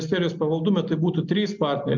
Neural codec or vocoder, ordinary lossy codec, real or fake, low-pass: none; MP3, 64 kbps; real; 7.2 kHz